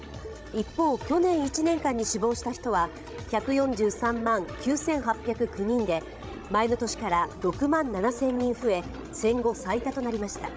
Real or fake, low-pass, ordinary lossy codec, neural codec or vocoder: fake; none; none; codec, 16 kHz, 16 kbps, FreqCodec, larger model